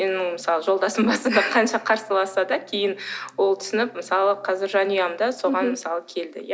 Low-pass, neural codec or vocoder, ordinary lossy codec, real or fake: none; none; none; real